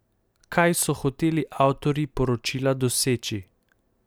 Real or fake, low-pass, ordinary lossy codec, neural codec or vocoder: real; none; none; none